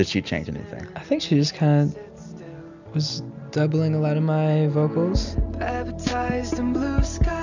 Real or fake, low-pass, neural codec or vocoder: real; 7.2 kHz; none